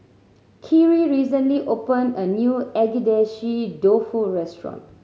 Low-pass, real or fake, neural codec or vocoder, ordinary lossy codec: none; real; none; none